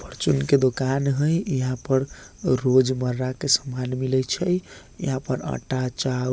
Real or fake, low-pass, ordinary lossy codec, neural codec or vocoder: real; none; none; none